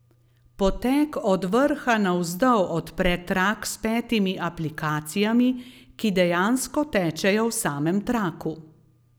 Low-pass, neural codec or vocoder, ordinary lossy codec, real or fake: none; none; none; real